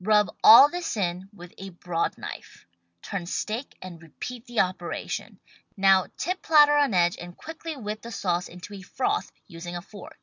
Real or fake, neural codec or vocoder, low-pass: real; none; 7.2 kHz